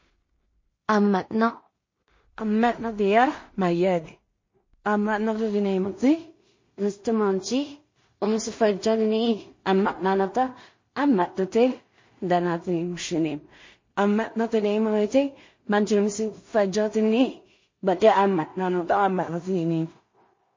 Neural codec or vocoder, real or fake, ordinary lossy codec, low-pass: codec, 16 kHz in and 24 kHz out, 0.4 kbps, LongCat-Audio-Codec, two codebook decoder; fake; MP3, 32 kbps; 7.2 kHz